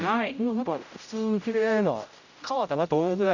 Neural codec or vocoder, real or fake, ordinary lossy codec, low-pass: codec, 16 kHz, 0.5 kbps, X-Codec, HuBERT features, trained on general audio; fake; none; 7.2 kHz